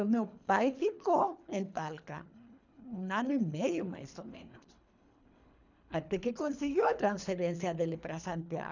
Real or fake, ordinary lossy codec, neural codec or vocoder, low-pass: fake; none; codec, 24 kHz, 3 kbps, HILCodec; 7.2 kHz